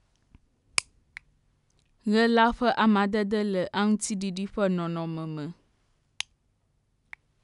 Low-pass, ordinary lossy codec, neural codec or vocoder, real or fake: 10.8 kHz; none; none; real